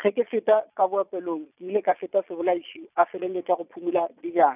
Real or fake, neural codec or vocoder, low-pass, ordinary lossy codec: fake; vocoder, 22.05 kHz, 80 mel bands, Vocos; 3.6 kHz; none